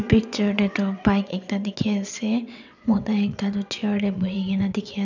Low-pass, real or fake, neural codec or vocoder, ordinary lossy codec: 7.2 kHz; fake; vocoder, 22.05 kHz, 80 mel bands, WaveNeXt; none